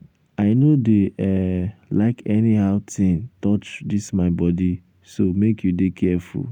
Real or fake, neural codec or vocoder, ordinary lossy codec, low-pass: real; none; none; 19.8 kHz